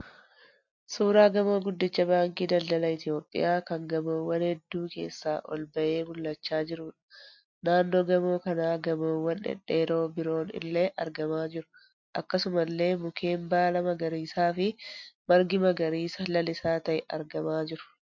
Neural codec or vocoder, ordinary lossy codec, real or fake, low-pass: none; MP3, 48 kbps; real; 7.2 kHz